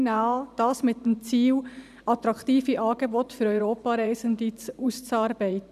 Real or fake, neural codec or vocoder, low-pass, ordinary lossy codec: fake; vocoder, 44.1 kHz, 128 mel bands every 512 samples, BigVGAN v2; 14.4 kHz; none